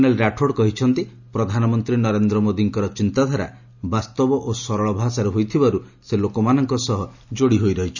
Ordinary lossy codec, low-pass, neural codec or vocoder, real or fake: none; 7.2 kHz; none; real